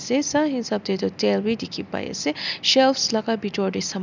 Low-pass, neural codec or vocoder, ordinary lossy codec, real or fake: 7.2 kHz; none; none; real